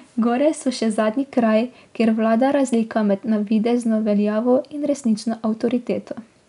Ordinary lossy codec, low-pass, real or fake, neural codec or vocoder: none; 10.8 kHz; real; none